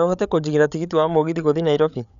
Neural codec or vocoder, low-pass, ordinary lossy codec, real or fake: codec, 16 kHz, 8 kbps, FreqCodec, larger model; 7.2 kHz; none; fake